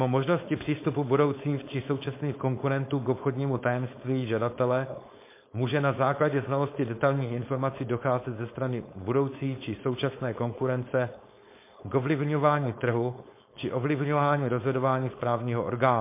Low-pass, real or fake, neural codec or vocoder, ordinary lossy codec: 3.6 kHz; fake; codec, 16 kHz, 4.8 kbps, FACodec; MP3, 24 kbps